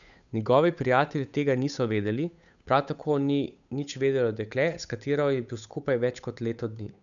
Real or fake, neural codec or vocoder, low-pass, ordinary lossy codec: fake; codec, 16 kHz, 8 kbps, FunCodec, trained on Chinese and English, 25 frames a second; 7.2 kHz; none